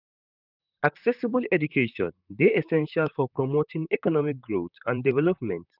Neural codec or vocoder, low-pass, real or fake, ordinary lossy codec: vocoder, 44.1 kHz, 128 mel bands, Pupu-Vocoder; 5.4 kHz; fake; none